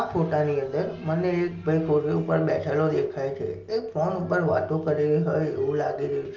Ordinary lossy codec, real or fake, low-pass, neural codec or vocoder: Opus, 24 kbps; real; 7.2 kHz; none